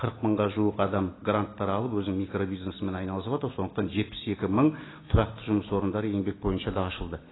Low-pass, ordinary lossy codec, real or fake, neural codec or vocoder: 7.2 kHz; AAC, 16 kbps; real; none